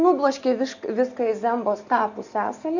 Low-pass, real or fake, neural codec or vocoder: 7.2 kHz; real; none